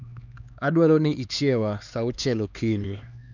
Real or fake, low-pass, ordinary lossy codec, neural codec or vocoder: fake; 7.2 kHz; none; codec, 16 kHz, 2 kbps, X-Codec, HuBERT features, trained on LibriSpeech